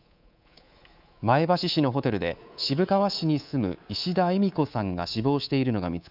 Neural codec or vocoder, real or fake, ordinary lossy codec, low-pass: codec, 24 kHz, 3.1 kbps, DualCodec; fake; none; 5.4 kHz